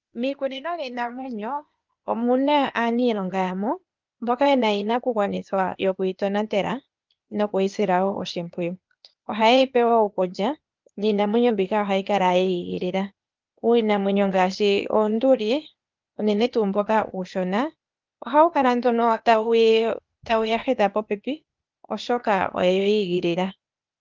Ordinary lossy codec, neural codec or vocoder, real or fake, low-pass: Opus, 32 kbps; codec, 16 kHz, 0.8 kbps, ZipCodec; fake; 7.2 kHz